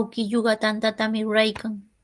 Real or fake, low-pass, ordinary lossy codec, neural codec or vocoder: real; 10.8 kHz; Opus, 24 kbps; none